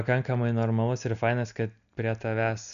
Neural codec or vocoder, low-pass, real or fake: none; 7.2 kHz; real